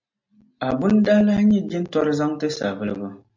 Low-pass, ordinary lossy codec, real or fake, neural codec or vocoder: 7.2 kHz; MP3, 48 kbps; real; none